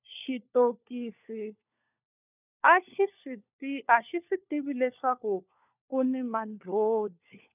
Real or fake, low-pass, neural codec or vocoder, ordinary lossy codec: fake; 3.6 kHz; codec, 16 kHz, 4 kbps, FunCodec, trained on LibriTTS, 50 frames a second; none